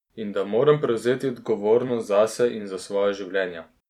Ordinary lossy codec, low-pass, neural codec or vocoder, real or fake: none; 19.8 kHz; vocoder, 44.1 kHz, 128 mel bands every 256 samples, BigVGAN v2; fake